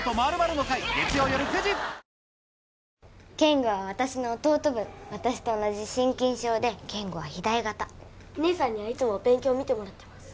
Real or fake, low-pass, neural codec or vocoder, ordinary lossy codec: real; none; none; none